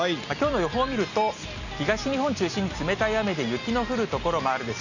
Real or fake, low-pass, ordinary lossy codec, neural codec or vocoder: real; 7.2 kHz; none; none